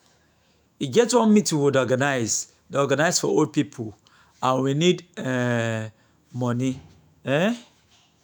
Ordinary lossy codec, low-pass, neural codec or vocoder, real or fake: none; none; autoencoder, 48 kHz, 128 numbers a frame, DAC-VAE, trained on Japanese speech; fake